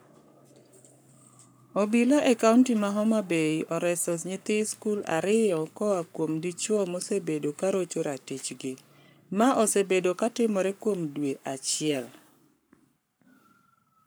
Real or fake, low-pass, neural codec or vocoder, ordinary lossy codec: fake; none; codec, 44.1 kHz, 7.8 kbps, Pupu-Codec; none